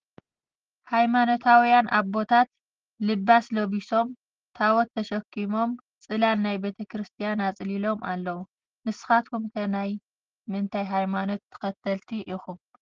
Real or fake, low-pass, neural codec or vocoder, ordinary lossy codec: real; 7.2 kHz; none; Opus, 16 kbps